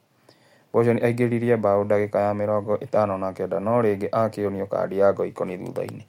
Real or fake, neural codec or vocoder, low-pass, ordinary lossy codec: real; none; 19.8 kHz; MP3, 64 kbps